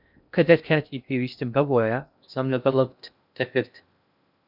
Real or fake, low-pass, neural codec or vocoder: fake; 5.4 kHz; codec, 16 kHz in and 24 kHz out, 0.6 kbps, FocalCodec, streaming, 2048 codes